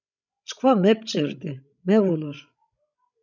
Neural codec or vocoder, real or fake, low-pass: codec, 16 kHz, 16 kbps, FreqCodec, larger model; fake; 7.2 kHz